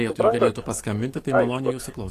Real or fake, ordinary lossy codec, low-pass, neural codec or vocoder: fake; AAC, 48 kbps; 14.4 kHz; codec, 44.1 kHz, 7.8 kbps, DAC